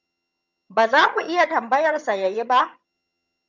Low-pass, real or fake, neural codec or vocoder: 7.2 kHz; fake; vocoder, 22.05 kHz, 80 mel bands, HiFi-GAN